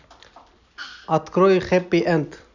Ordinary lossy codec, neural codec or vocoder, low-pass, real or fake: none; none; 7.2 kHz; real